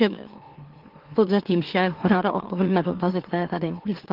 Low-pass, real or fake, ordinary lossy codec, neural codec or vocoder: 5.4 kHz; fake; Opus, 16 kbps; autoencoder, 44.1 kHz, a latent of 192 numbers a frame, MeloTTS